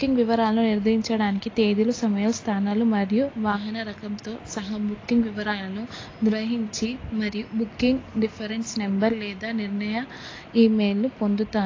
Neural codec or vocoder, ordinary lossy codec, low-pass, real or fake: none; AAC, 32 kbps; 7.2 kHz; real